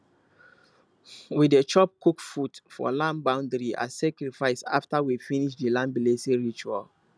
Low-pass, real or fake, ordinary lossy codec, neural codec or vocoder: none; real; none; none